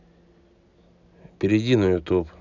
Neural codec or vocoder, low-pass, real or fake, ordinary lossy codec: none; 7.2 kHz; real; none